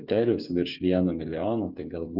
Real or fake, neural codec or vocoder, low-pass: fake; codec, 16 kHz, 8 kbps, FreqCodec, smaller model; 5.4 kHz